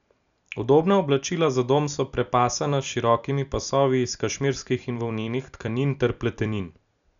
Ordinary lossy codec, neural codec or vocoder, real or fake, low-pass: none; none; real; 7.2 kHz